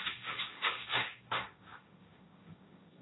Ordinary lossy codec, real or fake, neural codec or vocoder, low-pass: AAC, 16 kbps; fake; codec, 16 kHz, 1.1 kbps, Voila-Tokenizer; 7.2 kHz